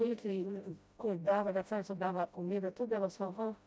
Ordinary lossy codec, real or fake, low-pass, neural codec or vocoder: none; fake; none; codec, 16 kHz, 0.5 kbps, FreqCodec, smaller model